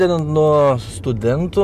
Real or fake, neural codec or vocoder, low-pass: real; none; 14.4 kHz